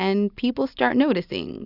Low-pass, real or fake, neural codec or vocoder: 5.4 kHz; real; none